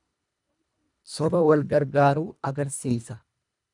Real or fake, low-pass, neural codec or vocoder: fake; 10.8 kHz; codec, 24 kHz, 1.5 kbps, HILCodec